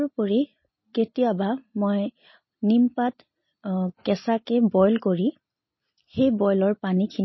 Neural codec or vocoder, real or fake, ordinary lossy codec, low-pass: none; real; MP3, 24 kbps; 7.2 kHz